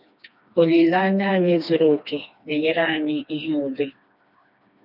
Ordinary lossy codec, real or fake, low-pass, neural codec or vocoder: none; fake; 5.4 kHz; codec, 16 kHz, 2 kbps, FreqCodec, smaller model